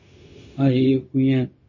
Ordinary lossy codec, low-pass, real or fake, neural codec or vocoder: MP3, 32 kbps; 7.2 kHz; fake; codec, 16 kHz, 0.4 kbps, LongCat-Audio-Codec